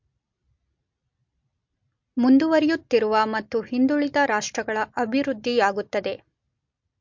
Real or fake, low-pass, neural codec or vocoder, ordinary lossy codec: real; 7.2 kHz; none; MP3, 48 kbps